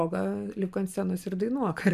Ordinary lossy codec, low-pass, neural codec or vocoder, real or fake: MP3, 96 kbps; 14.4 kHz; none; real